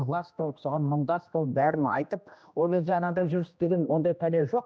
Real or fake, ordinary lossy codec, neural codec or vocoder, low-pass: fake; none; codec, 16 kHz, 1 kbps, X-Codec, HuBERT features, trained on general audio; none